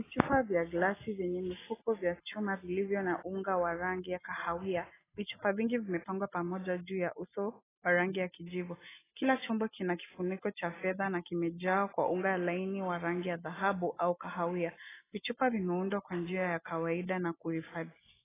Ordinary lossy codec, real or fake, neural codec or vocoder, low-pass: AAC, 16 kbps; real; none; 3.6 kHz